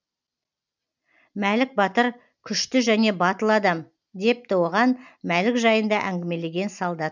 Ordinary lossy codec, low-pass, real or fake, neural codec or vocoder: none; 7.2 kHz; real; none